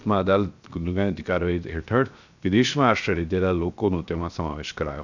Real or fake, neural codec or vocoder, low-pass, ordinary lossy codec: fake; codec, 16 kHz, 0.7 kbps, FocalCodec; 7.2 kHz; none